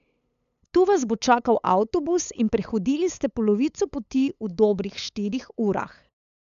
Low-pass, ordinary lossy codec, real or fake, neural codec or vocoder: 7.2 kHz; none; fake; codec, 16 kHz, 8 kbps, FunCodec, trained on LibriTTS, 25 frames a second